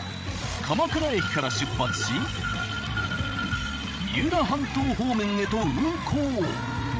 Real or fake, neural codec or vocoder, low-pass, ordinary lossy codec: fake; codec, 16 kHz, 16 kbps, FreqCodec, larger model; none; none